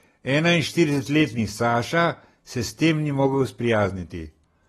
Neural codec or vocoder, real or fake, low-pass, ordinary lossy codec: none; real; 19.8 kHz; AAC, 32 kbps